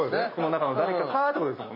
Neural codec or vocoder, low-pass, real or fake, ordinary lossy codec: none; 5.4 kHz; real; MP3, 24 kbps